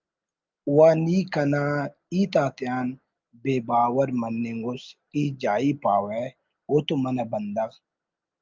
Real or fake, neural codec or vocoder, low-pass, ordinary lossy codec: real; none; 7.2 kHz; Opus, 24 kbps